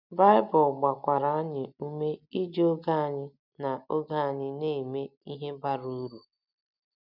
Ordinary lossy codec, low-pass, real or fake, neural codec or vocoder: none; 5.4 kHz; real; none